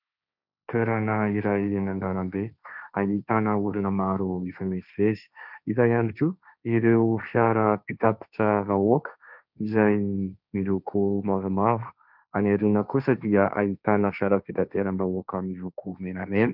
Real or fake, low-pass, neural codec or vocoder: fake; 5.4 kHz; codec, 16 kHz, 1.1 kbps, Voila-Tokenizer